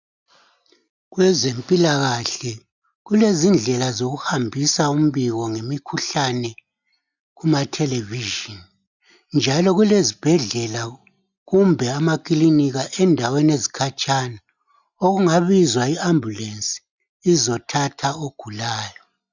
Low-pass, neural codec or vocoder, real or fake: 7.2 kHz; none; real